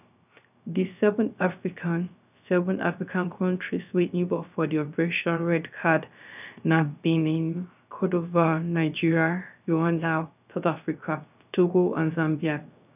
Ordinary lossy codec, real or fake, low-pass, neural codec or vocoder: none; fake; 3.6 kHz; codec, 16 kHz, 0.3 kbps, FocalCodec